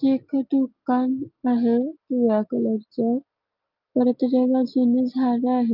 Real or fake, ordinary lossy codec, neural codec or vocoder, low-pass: real; Opus, 24 kbps; none; 5.4 kHz